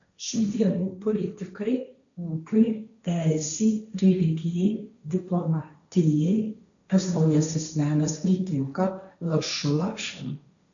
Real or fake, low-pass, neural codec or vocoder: fake; 7.2 kHz; codec, 16 kHz, 1.1 kbps, Voila-Tokenizer